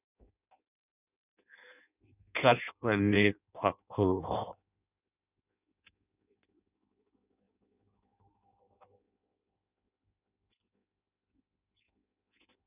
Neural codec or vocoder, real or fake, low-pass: codec, 16 kHz in and 24 kHz out, 0.6 kbps, FireRedTTS-2 codec; fake; 3.6 kHz